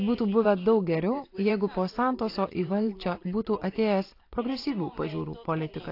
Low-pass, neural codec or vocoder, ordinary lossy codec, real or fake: 5.4 kHz; vocoder, 44.1 kHz, 128 mel bands every 512 samples, BigVGAN v2; AAC, 32 kbps; fake